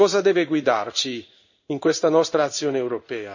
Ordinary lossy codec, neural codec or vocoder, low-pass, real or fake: none; codec, 16 kHz in and 24 kHz out, 1 kbps, XY-Tokenizer; 7.2 kHz; fake